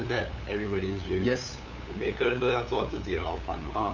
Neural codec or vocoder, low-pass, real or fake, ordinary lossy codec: codec, 16 kHz, 8 kbps, FunCodec, trained on LibriTTS, 25 frames a second; 7.2 kHz; fake; AAC, 48 kbps